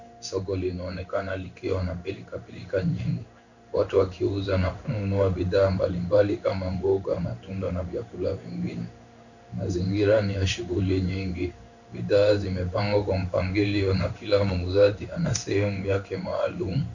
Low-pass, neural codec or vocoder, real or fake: 7.2 kHz; codec, 16 kHz in and 24 kHz out, 1 kbps, XY-Tokenizer; fake